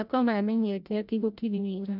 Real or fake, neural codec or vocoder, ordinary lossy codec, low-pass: fake; codec, 16 kHz, 0.5 kbps, FreqCodec, larger model; none; 5.4 kHz